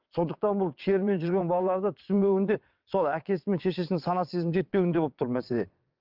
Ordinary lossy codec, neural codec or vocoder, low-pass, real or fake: Opus, 24 kbps; vocoder, 22.05 kHz, 80 mel bands, WaveNeXt; 5.4 kHz; fake